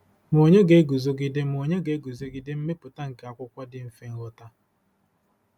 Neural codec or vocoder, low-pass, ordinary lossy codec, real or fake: none; 19.8 kHz; none; real